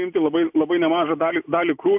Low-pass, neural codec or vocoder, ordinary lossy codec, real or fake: 3.6 kHz; none; AAC, 32 kbps; real